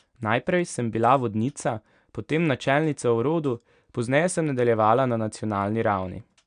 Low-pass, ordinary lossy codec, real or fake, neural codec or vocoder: 9.9 kHz; none; real; none